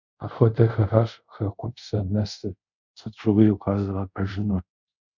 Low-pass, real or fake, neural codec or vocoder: 7.2 kHz; fake; codec, 24 kHz, 0.5 kbps, DualCodec